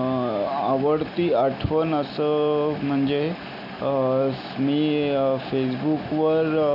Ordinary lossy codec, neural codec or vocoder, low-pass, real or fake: none; none; 5.4 kHz; real